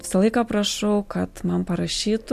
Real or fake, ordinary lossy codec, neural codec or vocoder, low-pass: real; MP3, 64 kbps; none; 14.4 kHz